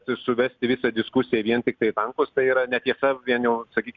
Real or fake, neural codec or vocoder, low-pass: real; none; 7.2 kHz